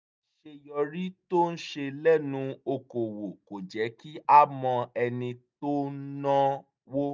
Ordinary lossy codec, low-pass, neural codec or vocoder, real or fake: none; none; none; real